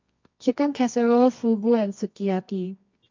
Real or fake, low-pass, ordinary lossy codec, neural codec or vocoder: fake; 7.2 kHz; MP3, 48 kbps; codec, 24 kHz, 0.9 kbps, WavTokenizer, medium music audio release